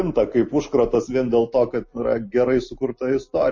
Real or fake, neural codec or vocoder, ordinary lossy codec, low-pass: real; none; MP3, 32 kbps; 7.2 kHz